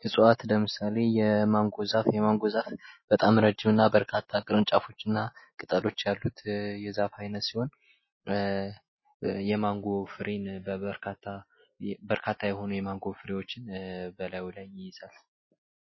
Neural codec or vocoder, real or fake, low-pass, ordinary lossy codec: none; real; 7.2 kHz; MP3, 24 kbps